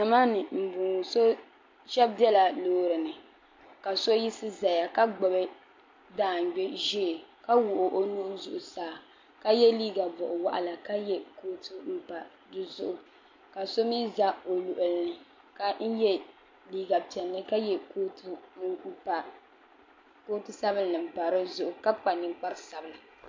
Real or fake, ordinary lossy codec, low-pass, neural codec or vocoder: real; MP3, 48 kbps; 7.2 kHz; none